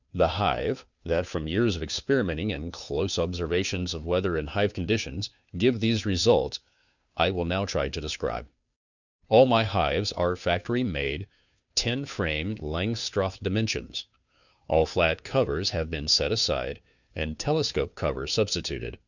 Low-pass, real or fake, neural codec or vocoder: 7.2 kHz; fake; codec, 16 kHz, 2 kbps, FunCodec, trained on Chinese and English, 25 frames a second